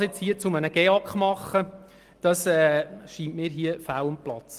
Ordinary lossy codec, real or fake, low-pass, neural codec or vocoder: Opus, 24 kbps; real; 14.4 kHz; none